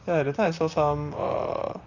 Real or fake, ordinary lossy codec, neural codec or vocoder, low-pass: fake; Opus, 64 kbps; vocoder, 44.1 kHz, 128 mel bands, Pupu-Vocoder; 7.2 kHz